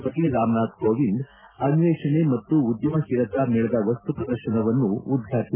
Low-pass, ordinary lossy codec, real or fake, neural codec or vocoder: 3.6 kHz; Opus, 24 kbps; real; none